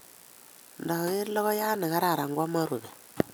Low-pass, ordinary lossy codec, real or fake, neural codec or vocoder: none; none; real; none